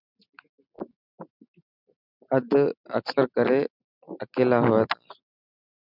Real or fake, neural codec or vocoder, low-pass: real; none; 5.4 kHz